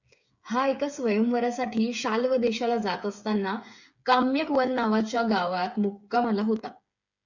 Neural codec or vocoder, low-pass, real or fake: codec, 16 kHz, 8 kbps, FreqCodec, smaller model; 7.2 kHz; fake